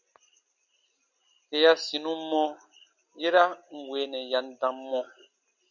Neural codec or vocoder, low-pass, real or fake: none; 7.2 kHz; real